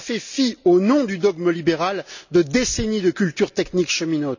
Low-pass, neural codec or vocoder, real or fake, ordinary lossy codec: 7.2 kHz; none; real; none